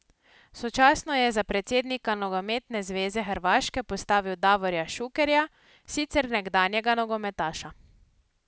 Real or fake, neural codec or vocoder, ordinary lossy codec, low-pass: real; none; none; none